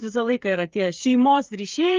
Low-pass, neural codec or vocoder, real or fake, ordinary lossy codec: 7.2 kHz; codec, 16 kHz, 8 kbps, FreqCodec, smaller model; fake; Opus, 32 kbps